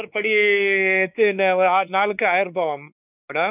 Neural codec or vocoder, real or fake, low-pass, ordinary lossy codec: codec, 16 kHz, 4 kbps, X-Codec, WavLM features, trained on Multilingual LibriSpeech; fake; 3.6 kHz; none